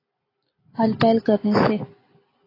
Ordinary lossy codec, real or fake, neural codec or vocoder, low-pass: AAC, 24 kbps; real; none; 5.4 kHz